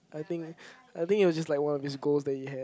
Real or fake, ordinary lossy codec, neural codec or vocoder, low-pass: real; none; none; none